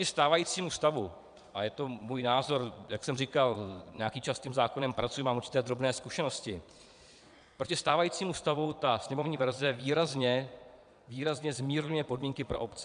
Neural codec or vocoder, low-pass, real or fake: vocoder, 22.05 kHz, 80 mel bands, Vocos; 9.9 kHz; fake